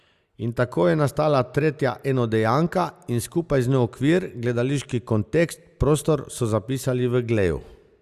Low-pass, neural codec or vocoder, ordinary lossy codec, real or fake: 14.4 kHz; none; Opus, 64 kbps; real